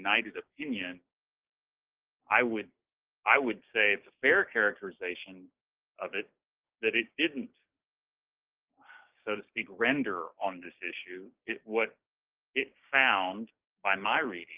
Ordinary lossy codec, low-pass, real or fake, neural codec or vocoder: Opus, 24 kbps; 3.6 kHz; fake; codec, 16 kHz, 6 kbps, DAC